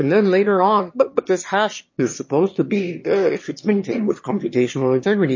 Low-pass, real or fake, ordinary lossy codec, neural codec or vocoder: 7.2 kHz; fake; MP3, 32 kbps; autoencoder, 22.05 kHz, a latent of 192 numbers a frame, VITS, trained on one speaker